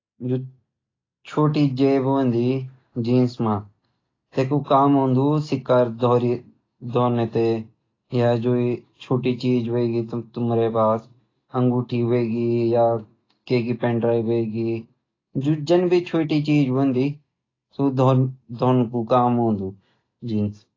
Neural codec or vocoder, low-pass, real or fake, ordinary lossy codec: none; 7.2 kHz; real; AAC, 32 kbps